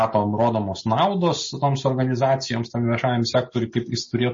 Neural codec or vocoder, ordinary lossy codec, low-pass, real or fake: none; MP3, 32 kbps; 7.2 kHz; real